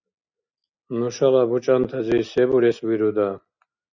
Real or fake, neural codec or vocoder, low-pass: real; none; 7.2 kHz